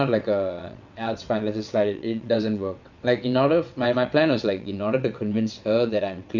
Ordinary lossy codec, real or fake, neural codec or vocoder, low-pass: none; fake; vocoder, 22.05 kHz, 80 mel bands, WaveNeXt; 7.2 kHz